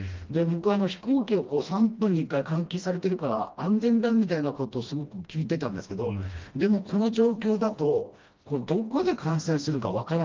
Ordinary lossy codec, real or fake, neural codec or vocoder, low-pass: Opus, 24 kbps; fake; codec, 16 kHz, 1 kbps, FreqCodec, smaller model; 7.2 kHz